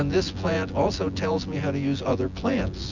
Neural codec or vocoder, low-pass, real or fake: vocoder, 24 kHz, 100 mel bands, Vocos; 7.2 kHz; fake